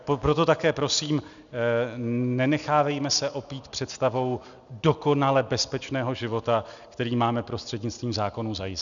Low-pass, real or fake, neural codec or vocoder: 7.2 kHz; real; none